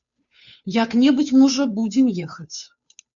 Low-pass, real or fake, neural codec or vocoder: 7.2 kHz; fake; codec, 16 kHz, 2 kbps, FunCodec, trained on Chinese and English, 25 frames a second